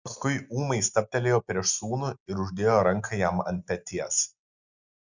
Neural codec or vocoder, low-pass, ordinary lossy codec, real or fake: none; 7.2 kHz; Opus, 64 kbps; real